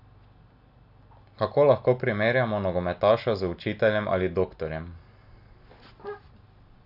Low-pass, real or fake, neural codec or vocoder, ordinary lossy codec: 5.4 kHz; real; none; AAC, 48 kbps